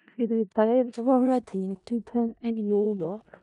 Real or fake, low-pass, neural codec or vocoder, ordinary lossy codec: fake; 10.8 kHz; codec, 16 kHz in and 24 kHz out, 0.4 kbps, LongCat-Audio-Codec, four codebook decoder; none